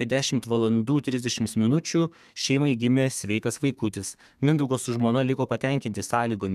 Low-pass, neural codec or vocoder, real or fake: 14.4 kHz; codec, 32 kHz, 1.9 kbps, SNAC; fake